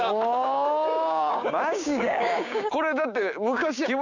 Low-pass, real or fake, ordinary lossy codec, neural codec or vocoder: 7.2 kHz; fake; none; codec, 16 kHz, 6 kbps, DAC